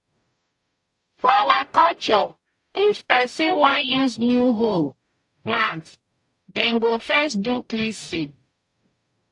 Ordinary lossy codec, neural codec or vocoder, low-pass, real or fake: AAC, 64 kbps; codec, 44.1 kHz, 0.9 kbps, DAC; 10.8 kHz; fake